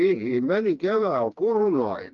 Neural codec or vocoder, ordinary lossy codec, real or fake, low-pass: codec, 16 kHz, 2 kbps, FreqCodec, smaller model; Opus, 32 kbps; fake; 7.2 kHz